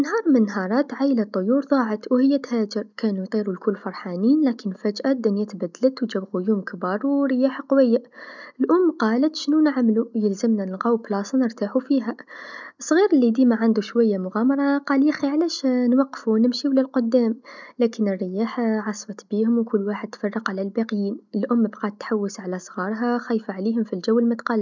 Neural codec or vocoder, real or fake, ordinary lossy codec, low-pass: none; real; none; 7.2 kHz